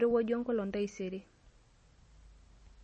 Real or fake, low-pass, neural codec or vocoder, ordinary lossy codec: real; 10.8 kHz; none; MP3, 32 kbps